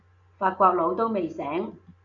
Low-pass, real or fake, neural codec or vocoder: 7.2 kHz; real; none